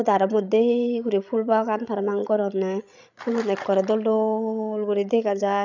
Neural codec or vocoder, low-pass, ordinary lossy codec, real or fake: codec, 16 kHz, 16 kbps, FreqCodec, larger model; 7.2 kHz; none; fake